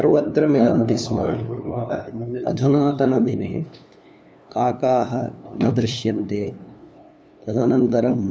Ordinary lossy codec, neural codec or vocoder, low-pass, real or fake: none; codec, 16 kHz, 2 kbps, FunCodec, trained on LibriTTS, 25 frames a second; none; fake